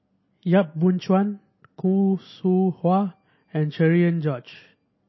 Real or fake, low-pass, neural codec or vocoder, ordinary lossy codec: real; 7.2 kHz; none; MP3, 24 kbps